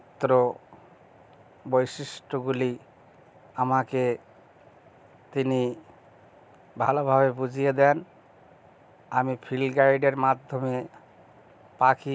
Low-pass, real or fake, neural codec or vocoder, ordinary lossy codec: none; real; none; none